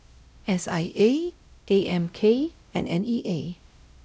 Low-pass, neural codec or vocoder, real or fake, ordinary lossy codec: none; codec, 16 kHz, 0.5 kbps, X-Codec, WavLM features, trained on Multilingual LibriSpeech; fake; none